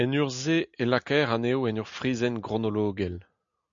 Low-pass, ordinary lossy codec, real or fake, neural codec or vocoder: 7.2 kHz; MP3, 64 kbps; real; none